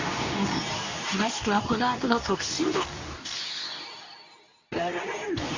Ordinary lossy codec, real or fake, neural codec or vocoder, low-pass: AAC, 48 kbps; fake; codec, 24 kHz, 0.9 kbps, WavTokenizer, medium speech release version 2; 7.2 kHz